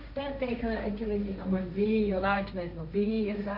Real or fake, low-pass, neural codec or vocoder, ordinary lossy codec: fake; 5.4 kHz; codec, 16 kHz, 1.1 kbps, Voila-Tokenizer; none